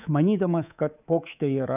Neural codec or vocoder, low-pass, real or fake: codec, 24 kHz, 3.1 kbps, DualCodec; 3.6 kHz; fake